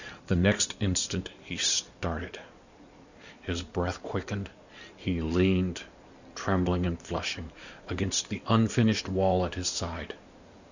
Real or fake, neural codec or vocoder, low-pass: fake; codec, 16 kHz in and 24 kHz out, 2.2 kbps, FireRedTTS-2 codec; 7.2 kHz